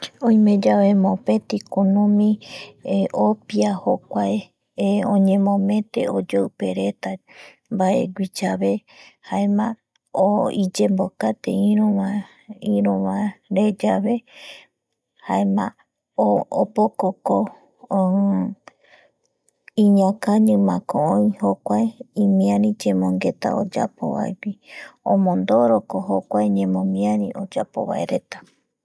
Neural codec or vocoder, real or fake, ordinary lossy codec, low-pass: none; real; none; none